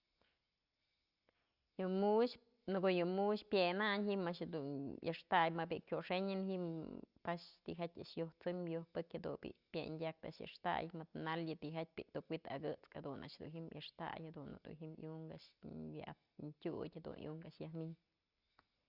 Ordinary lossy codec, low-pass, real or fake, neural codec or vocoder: Opus, 64 kbps; 5.4 kHz; real; none